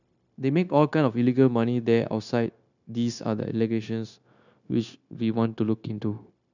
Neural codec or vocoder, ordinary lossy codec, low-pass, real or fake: codec, 16 kHz, 0.9 kbps, LongCat-Audio-Codec; none; 7.2 kHz; fake